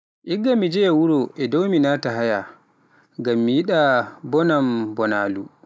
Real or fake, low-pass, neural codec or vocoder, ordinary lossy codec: real; none; none; none